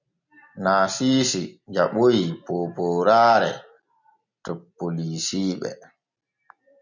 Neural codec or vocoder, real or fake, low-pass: none; real; 7.2 kHz